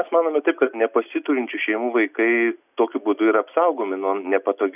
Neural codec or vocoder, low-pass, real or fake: none; 3.6 kHz; real